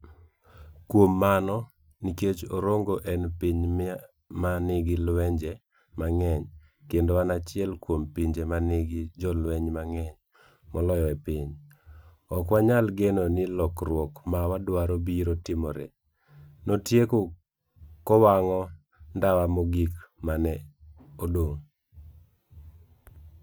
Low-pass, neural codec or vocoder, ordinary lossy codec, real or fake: none; none; none; real